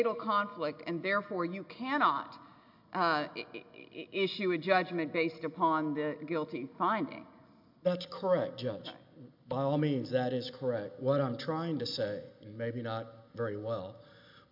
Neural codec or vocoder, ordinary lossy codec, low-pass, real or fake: none; MP3, 48 kbps; 5.4 kHz; real